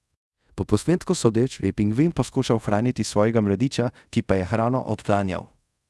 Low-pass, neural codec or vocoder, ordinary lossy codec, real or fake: none; codec, 24 kHz, 0.5 kbps, DualCodec; none; fake